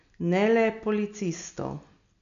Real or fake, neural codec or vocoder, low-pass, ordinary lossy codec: real; none; 7.2 kHz; none